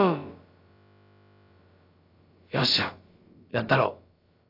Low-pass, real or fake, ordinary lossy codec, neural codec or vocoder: 5.4 kHz; fake; AAC, 32 kbps; codec, 16 kHz, about 1 kbps, DyCAST, with the encoder's durations